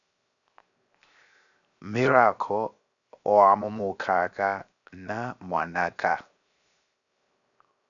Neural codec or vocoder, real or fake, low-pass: codec, 16 kHz, 0.7 kbps, FocalCodec; fake; 7.2 kHz